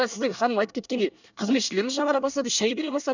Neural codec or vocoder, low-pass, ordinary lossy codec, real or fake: codec, 24 kHz, 1 kbps, SNAC; 7.2 kHz; none; fake